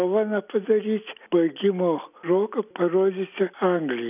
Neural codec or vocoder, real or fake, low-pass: none; real; 3.6 kHz